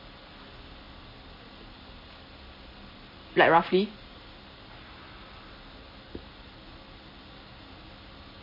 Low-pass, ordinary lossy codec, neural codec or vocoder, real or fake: 5.4 kHz; MP3, 24 kbps; none; real